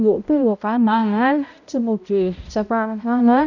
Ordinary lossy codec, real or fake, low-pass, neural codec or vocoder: none; fake; 7.2 kHz; codec, 16 kHz, 0.5 kbps, X-Codec, HuBERT features, trained on balanced general audio